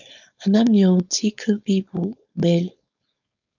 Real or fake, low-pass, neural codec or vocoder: fake; 7.2 kHz; codec, 16 kHz, 4.8 kbps, FACodec